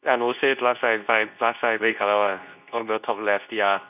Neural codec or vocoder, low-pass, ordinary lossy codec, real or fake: codec, 24 kHz, 0.9 kbps, WavTokenizer, medium speech release version 2; 3.6 kHz; none; fake